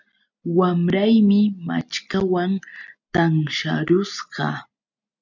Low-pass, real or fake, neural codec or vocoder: 7.2 kHz; real; none